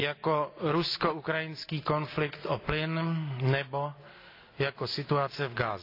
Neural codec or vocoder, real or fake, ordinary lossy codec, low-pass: none; real; AAC, 32 kbps; 5.4 kHz